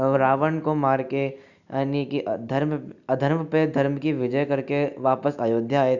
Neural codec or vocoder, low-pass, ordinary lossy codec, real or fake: none; 7.2 kHz; none; real